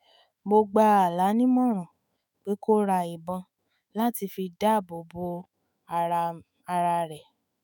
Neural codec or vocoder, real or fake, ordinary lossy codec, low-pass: autoencoder, 48 kHz, 128 numbers a frame, DAC-VAE, trained on Japanese speech; fake; none; none